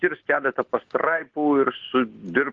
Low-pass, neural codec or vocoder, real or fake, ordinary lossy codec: 7.2 kHz; none; real; Opus, 16 kbps